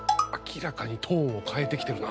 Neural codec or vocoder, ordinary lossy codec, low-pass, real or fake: none; none; none; real